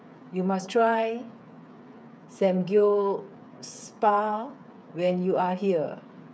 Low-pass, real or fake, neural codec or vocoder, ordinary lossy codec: none; fake; codec, 16 kHz, 8 kbps, FreqCodec, smaller model; none